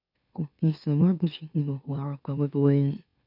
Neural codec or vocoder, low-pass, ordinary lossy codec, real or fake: autoencoder, 44.1 kHz, a latent of 192 numbers a frame, MeloTTS; 5.4 kHz; none; fake